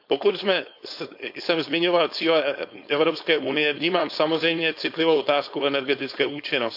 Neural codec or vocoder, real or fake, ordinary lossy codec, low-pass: codec, 16 kHz, 4.8 kbps, FACodec; fake; AAC, 48 kbps; 5.4 kHz